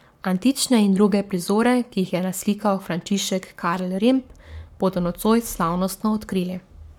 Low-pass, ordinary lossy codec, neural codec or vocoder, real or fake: 19.8 kHz; none; codec, 44.1 kHz, 7.8 kbps, Pupu-Codec; fake